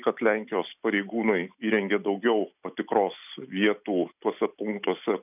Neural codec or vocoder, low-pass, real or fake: none; 3.6 kHz; real